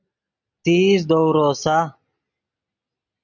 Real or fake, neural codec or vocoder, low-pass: real; none; 7.2 kHz